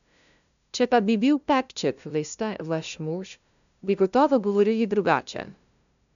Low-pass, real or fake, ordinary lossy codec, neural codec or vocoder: 7.2 kHz; fake; none; codec, 16 kHz, 0.5 kbps, FunCodec, trained on LibriTTS, 25 frames a second